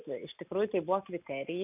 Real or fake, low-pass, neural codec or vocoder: real; 3.6 kHz; none